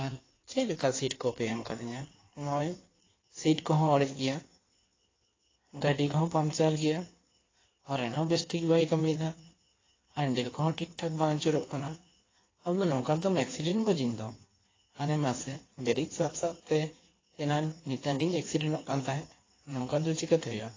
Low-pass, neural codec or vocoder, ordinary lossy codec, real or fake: 7.2 kHz; codec, 16 kHz in and 24 kHz out, 1.1 kbps, FireRedTTS-2 codec; AAC, 32 kbps; fake